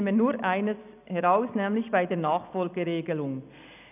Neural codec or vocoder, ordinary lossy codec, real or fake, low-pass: none; none; real; 3.6 kHz